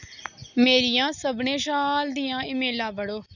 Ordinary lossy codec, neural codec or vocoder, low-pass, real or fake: none; none; 7.2 kHz; real